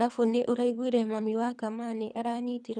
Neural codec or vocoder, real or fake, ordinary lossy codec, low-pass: codec, 24 kHz, 3 kbps, HILCodec; fake; none; 9.9 kHz